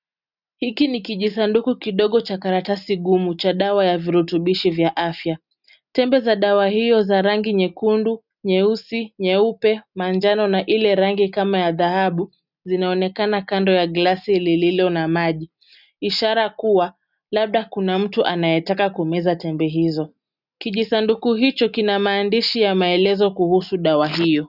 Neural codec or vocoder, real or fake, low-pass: none; real; 5.4 kHz